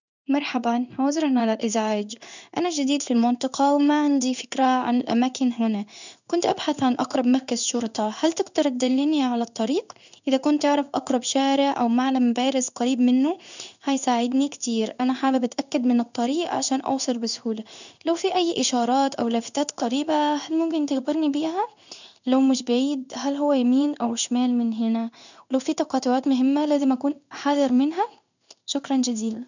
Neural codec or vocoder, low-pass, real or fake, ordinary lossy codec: codec, 16 kHz in and 24 kHz out, 1 kbps, XY-Tokenizer; 7.2 kHz; fake; none